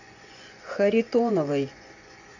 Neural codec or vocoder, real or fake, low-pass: vocoder, 24 kHz, 100 mel bands, Vocos; fake; 7.2 kHz